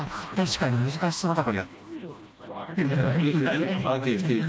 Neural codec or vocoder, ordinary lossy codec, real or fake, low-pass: codec, 16 kHz, 1 kbps, FreqCodec, smaller model; none; fake; none